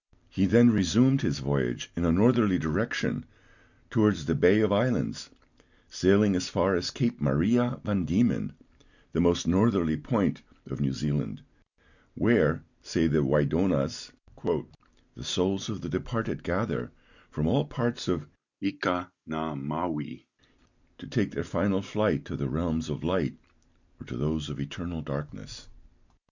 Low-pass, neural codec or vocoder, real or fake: 7.2 kHz; none; real